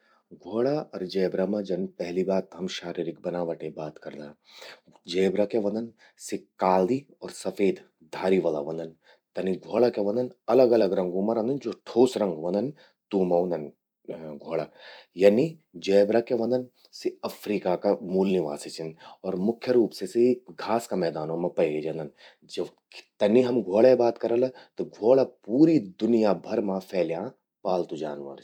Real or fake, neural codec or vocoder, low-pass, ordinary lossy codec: fake; vocoder, 44.1 kHz, 128 mel bands every 256 samples, BigVGAN v2; 19.8 kHz; none